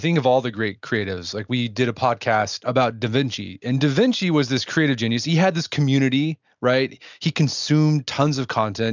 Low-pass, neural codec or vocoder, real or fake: 7.2 kHz; none; real